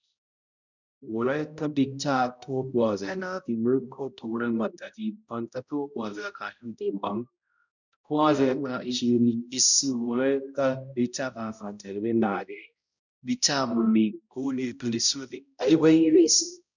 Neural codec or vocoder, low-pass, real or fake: codec, 16 kHz, 0.5 kbps, X-Codec, HuBERT features, trained on balanced general audio; 7.2 kHz; fake